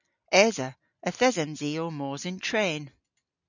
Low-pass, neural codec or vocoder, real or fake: 7.2 kHz; none; real